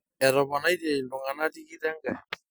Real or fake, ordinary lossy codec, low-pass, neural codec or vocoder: real; none; none; none